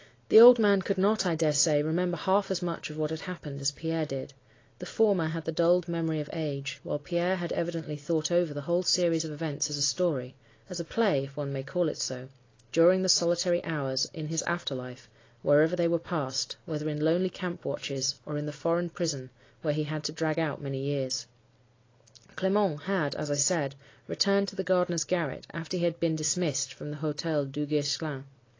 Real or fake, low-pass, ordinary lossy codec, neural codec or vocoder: real; 7.2 kHz; AAC, 32 kbps; none